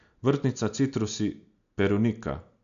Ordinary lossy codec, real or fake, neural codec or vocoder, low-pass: none; real; none; 7.2 kHz